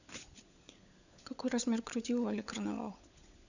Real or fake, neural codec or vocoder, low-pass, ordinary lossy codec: fake; codec, 16 kHz, 16 kbps, FunCodec, trained on LibriTTS, 50 frames a second; 7.2 kHz; none